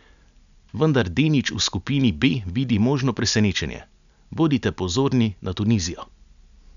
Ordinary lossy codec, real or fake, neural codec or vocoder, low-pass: none; real; none; 7.2 kHz